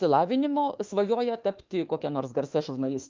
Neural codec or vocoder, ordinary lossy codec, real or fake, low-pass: autoencoder, 48 kHz, 32 numbers a frame, DAC-VAE, trained on Japanese speech; Opus, 32 kbps; fake; 7.2 kHz